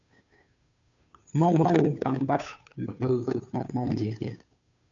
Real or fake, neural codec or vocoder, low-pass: fake; codec, 16 kHz, 2 kbps, FunCodec, trained on Chinese and English, 25 frames a second; 7.2 kHz